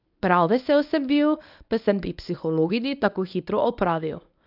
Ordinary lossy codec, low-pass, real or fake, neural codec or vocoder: none; 5.4 kHz; fake; codec, 24 kHz, 0.9 kbps, WavTokenizer, medium speech release version 1